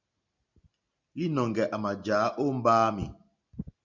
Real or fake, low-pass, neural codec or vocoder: real; 7.2 kHz; none